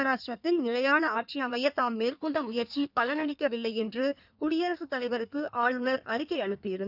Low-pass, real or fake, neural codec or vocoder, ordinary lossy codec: 5.4 kHz; fake; codec, 16 kHz in and 24 kHz out, 1.1 kbps, FireRedTTS-2 codec; none